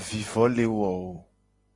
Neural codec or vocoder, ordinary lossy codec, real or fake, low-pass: none; AAC, 32 kbps; real; 10.8 kHz